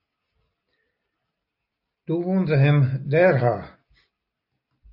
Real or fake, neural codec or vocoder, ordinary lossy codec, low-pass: real; none; AAC, 48 kbps; 5.4 kHz